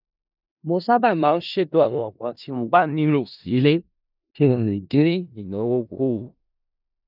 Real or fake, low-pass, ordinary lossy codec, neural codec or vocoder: fake; 5.4 kHz; none; codec, 16 kHz in and 24 kHz out, 0.4 kbps, LongCat-Audio-Codec, four codebook decoder